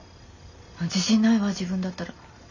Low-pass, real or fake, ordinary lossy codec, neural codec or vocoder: 7.2 kHz; real; none; none